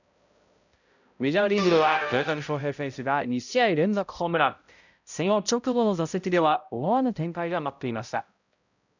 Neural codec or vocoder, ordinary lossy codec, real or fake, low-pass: codec, 16 kHz, 0.5 kbps, X-Codec, HuBERT features, trained on balanced general audio; none; fake; 7.2 kHz